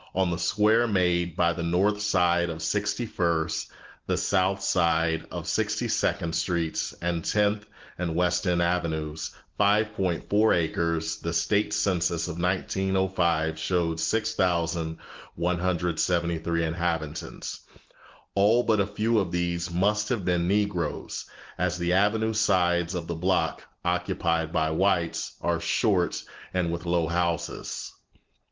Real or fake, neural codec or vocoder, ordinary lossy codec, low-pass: real; none; Opus, 16 kbps; 7.2 kHz